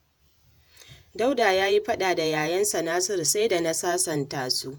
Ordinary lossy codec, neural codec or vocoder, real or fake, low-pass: none; vocoder, 48 kHz, 128 mel bands, Vocos; fake; none